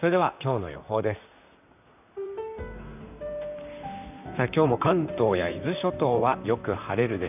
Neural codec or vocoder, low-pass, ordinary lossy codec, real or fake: vocoder, 44.1 kHz, 128 mel bands, Pupu-Vocoder; 3.6 kHz; none; fake